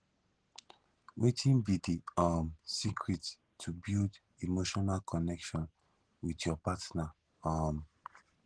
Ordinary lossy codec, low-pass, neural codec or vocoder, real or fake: Opus, 16 kbps; 9.9 kHz; none; real